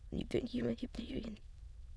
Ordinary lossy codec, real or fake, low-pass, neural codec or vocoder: none; fake; none; autoencoder, 22.05 kHz, a latent of 192 numbers a frame, VITS, trained on many speakers